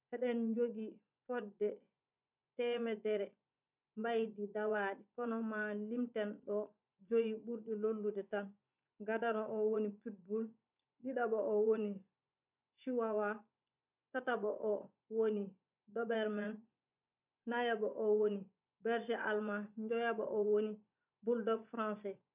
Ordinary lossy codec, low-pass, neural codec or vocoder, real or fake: none; 3.6 kHz; vocoder, 24 kHz, 100 mel bands, Vocos; fake